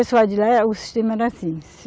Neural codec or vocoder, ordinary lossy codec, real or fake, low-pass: none; none; real; none